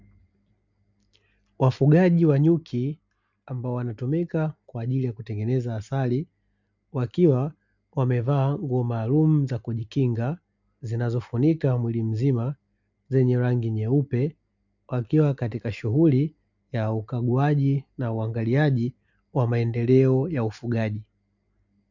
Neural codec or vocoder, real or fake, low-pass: none; real; 7.2 kHz